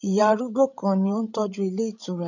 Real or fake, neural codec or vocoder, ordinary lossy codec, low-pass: fake; codec, 16 kHz, 16 kbps, FreqCodec, larger model; none; 7.2 kHz